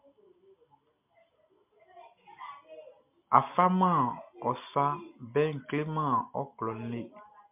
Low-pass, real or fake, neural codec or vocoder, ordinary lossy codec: 3.6 kHz; real; none; MP3, 32 kbps